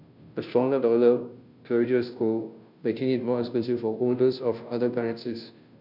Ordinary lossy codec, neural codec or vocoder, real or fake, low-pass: none; codec, 16 kHz, 0.5 kbps, FunCodec, trained on Chinese and English, 25 frames a second; fake; 5.4 kHz